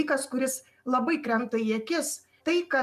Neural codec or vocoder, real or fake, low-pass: none; real; 14.4 kHz